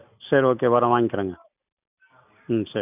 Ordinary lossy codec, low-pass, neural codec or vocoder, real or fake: none; 3.6 kHz; none; real